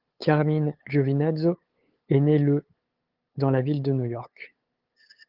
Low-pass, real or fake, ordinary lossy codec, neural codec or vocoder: 5.4 kHz; fake; Opus, 16 kbps; codec, 16 kHz, 8 kbps, FunCodec, trained on LibriTTS, 25 frames a second